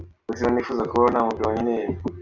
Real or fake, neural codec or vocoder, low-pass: real; none; 7.2 kHz